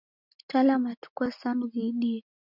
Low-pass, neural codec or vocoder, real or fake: 5.4 kHz; none; real